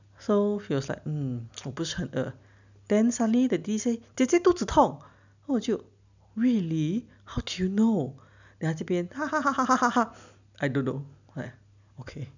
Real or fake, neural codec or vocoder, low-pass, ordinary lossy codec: real; none; 7.2 kHz; none